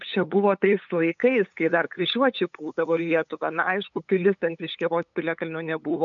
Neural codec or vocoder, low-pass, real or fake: codec, 16 kHz, 8 kbps, FunCodec, trained on LibriTTS, 25 frames a second; 7.2 kHz; fake